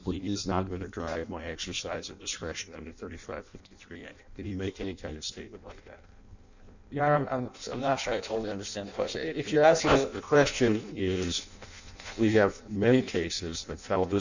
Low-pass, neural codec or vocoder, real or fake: 7.2 kHz; codec, 16 kHz in and 24 kHz out, 0.6 kbps, FireRedTTS-2 codec; fake